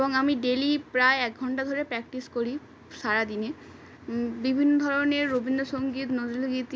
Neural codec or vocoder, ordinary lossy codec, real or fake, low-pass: none; none; real; none